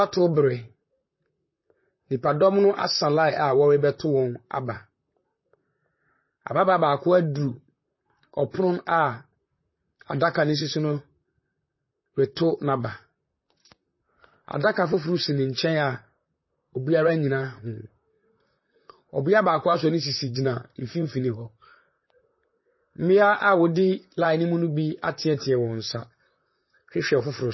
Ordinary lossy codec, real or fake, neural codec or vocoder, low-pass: MP3, 24 kbps; fake; vocoder, 44.1 kHz, 128 mel bands, Pupu-Vocoder; 7.2 kHz